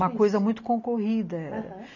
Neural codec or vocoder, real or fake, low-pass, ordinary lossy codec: none; real; 7.2 kHz; none